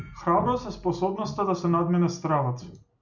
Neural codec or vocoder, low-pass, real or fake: none; 7.2 kHz; real